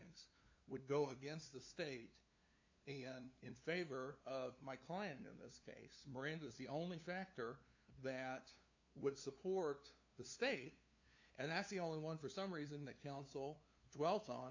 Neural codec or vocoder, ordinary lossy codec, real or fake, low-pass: codec, 16 kHz, 2 kbps, FunCodec, trained on LibriTTS, 25 frames a second; AAC, 48 kbps; fake; 7.2 kHz